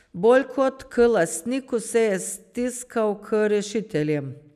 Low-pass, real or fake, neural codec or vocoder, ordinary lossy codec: 14.4 kHz; real; none; none